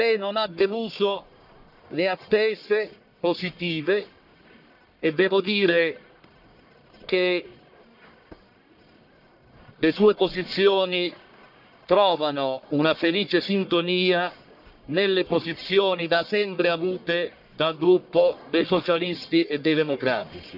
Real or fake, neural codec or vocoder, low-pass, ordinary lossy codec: fake; codec, 44.1 kHz, 1.7 kbps, Pupu-Codec; 5.4 kHz; none